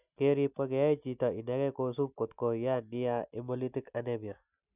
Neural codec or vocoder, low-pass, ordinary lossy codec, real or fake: none; 3.6 kHz; none; real